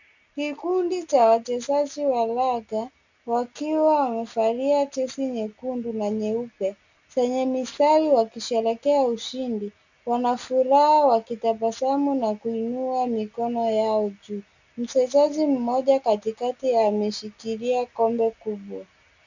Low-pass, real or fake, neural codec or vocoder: 7.2 kHz; real; none